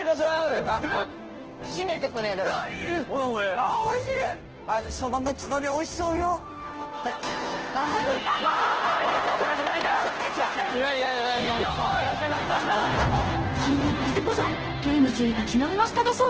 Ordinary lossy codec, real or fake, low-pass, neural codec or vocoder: Opus, 16 kbps; fake; 7.2 kHz; codec, 16 kHz, 0.5 kbps, FunCodec, trained on Chinese and English, 25 frames a second